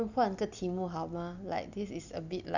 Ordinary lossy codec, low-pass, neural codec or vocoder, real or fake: none; 7.2 kHz; none; real